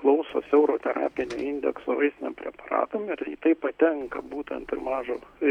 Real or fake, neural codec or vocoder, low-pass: real; none; 19.8 kHz